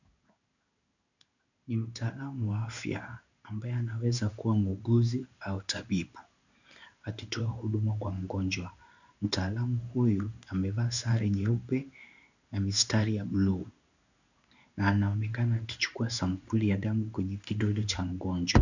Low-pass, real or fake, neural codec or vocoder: 7.2 kHz; fake; codec, 16 kHz in and 24 kHz out, 1 kbps, XY-Tokenizer